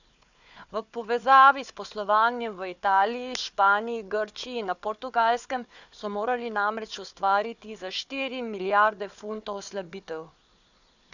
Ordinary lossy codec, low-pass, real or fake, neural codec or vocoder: none; 7.2 kHz; fake; codec, 16 kHz, 4 kbps, FunCodec, trained on Chinese and English, 50 frames a second